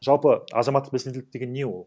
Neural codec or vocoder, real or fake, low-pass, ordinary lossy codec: none; real; none; none